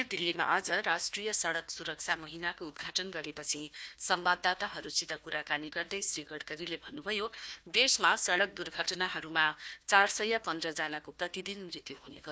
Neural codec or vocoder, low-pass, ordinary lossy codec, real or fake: codec, 16 kHz, 1 kbps, FunCodec, trained on Chinese and English, 50 frames a second; none; none; fake